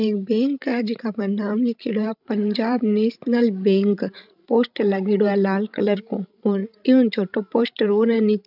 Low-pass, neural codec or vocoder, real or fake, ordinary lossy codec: 5.4 kHz; codec, 16 kHz, 16 kbps, FreqCodec, larger model; fake; none